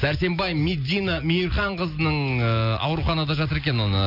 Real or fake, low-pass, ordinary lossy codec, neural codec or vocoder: real; 5.4 kHz; none; none